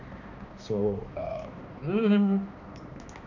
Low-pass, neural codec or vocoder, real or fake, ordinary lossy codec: 7.2 kHz; codec, 16 kHz, 2 kbps, X-Codec, HuBERT features, trained on balanced general audio; fake; none